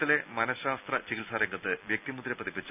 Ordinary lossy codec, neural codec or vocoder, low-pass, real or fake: none; none; 3.6 kHz; real